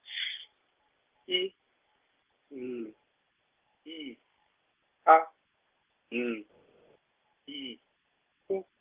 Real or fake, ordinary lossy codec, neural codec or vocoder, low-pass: real; Opus, 32 kbps; none; 3.6 kHz